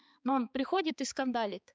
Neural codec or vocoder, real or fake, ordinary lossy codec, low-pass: codec, 16 kHz, 4 kbps, X-Codec, HuBERT features, trained on balanced general audio; fake; none; none